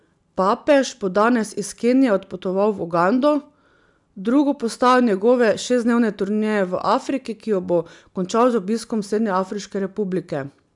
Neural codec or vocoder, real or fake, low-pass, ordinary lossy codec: none; real; 10.8 kHz; none